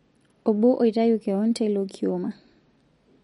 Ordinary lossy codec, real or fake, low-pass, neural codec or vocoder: MP3, 48 kbps; real; 19.8 kHz; none